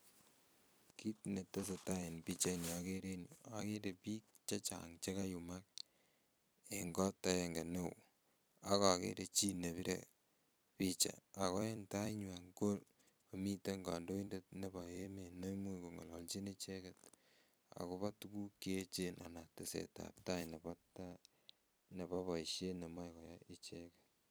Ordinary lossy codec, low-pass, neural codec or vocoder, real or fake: none; none; vocoder, 44.1 kHz, 128 mel bands every 256 samples, BigVGAN v2; fake